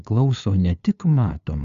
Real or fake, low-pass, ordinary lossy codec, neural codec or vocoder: fake; 7.2 kHz; Opus, 64 kbps; codec, 16 kHz, 2 kbps, FunCodec, trained on LibriTTS, 25 frames a second